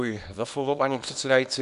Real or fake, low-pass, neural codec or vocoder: fake; 10.8 kHz; codec, 24 kHz, 0.9 kbps, WavTokenizer, small release